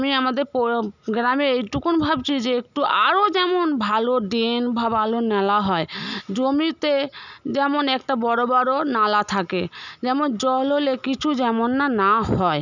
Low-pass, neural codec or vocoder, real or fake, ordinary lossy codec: 7.2 kHz; none; real; none